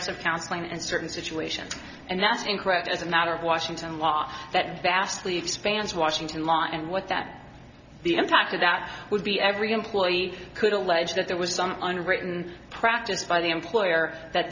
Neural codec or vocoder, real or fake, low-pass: none; real; 7.2 kHz